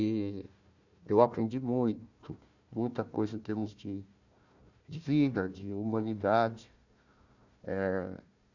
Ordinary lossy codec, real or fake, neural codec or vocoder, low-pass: none; fake; codec, 16 kHz, 1 kbps, FunCodec, trained on Chinese and English, 50 frames a second; 7.2 kHz